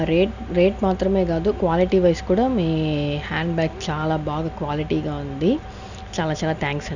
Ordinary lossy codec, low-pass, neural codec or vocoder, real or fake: none; 7.2 kHz; none; real